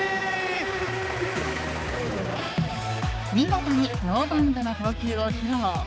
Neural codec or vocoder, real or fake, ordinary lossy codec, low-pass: codec, 16 kHz, 4 kbps, X-Codec, HuBERT features, trained on balanced general audio; fake; none; none